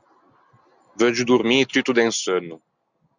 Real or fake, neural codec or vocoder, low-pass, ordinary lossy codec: real; none; 7.2 kHz; Opus, 64 kbps